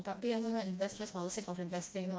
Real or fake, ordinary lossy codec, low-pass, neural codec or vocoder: fake; none; none; codec, 16 kHz, 1 kbps, FreqCodec, smaller model